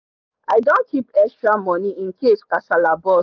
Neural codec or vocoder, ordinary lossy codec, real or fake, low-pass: codec, 44.1 kHz, 7.8 kbps, DAC; none; fake; 7.2 kHz